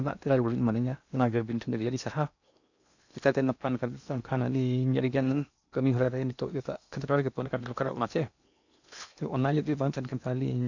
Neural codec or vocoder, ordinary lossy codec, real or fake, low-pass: codec, 16 kHz in and 24 kHz out, 0.8 kbps, FocalCodec, streaming, 65536 codes; none; fake; 7.2 kHz